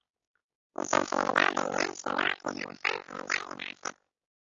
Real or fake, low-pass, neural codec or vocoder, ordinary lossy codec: fake; 7.2 kHz; codec, 16 kHz, 6 kbps, DAC; AAC, 32 kbps